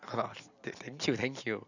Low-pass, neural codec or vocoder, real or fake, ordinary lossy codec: 7.2 kHz; none; real; none